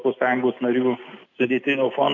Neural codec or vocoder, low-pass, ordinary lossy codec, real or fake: none; 7.2 kHz; AAC, 48 kbps; real